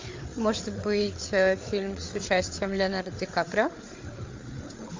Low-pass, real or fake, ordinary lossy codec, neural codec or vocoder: 7.2 kHz; fake; MP3, 48 kbps; codec, 16 kHz, 8 kbps, FreqCodec, larger model